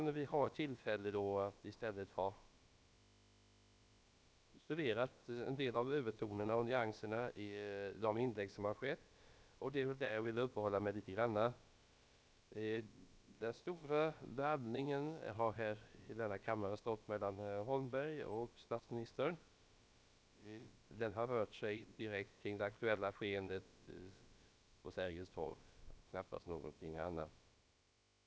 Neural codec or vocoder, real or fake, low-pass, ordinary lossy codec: codec, 16 kHz, about 1 kbps, DyCAST, with the encoder's durations; fake; none; none